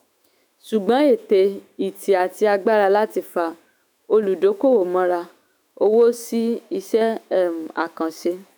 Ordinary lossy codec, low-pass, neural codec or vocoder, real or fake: none; none; autoencoder, 48 kHz, 128 numbers a frame, DAC-VAE, trained on Japanese speech; fake